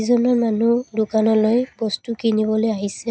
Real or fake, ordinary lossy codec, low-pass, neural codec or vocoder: real; none; none; none